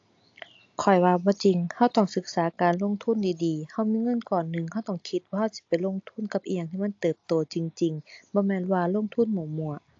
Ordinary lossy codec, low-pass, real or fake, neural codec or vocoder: AAC, 48 kbps; 7.2 kHz; real; none